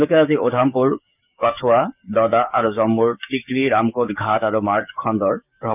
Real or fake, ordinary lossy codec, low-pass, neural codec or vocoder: fake; none; 3.6 kHz; codec, 16 kHz, 6 kbps, DAC